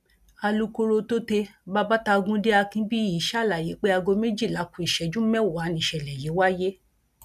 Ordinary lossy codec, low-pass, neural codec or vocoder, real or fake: none; 14.4 kHz; none; real